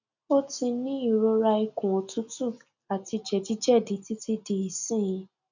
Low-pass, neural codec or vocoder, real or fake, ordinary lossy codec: 7.2 kHz; none; real; none